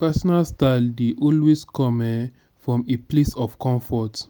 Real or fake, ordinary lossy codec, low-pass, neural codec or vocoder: real; none; none; none